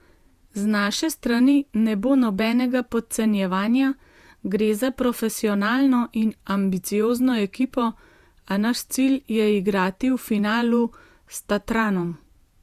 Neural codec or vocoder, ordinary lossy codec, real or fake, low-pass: vocoder, 48 kHz, 128 mel bands, Vocos; Opus, 64 kbps; fake; 14.4 kHz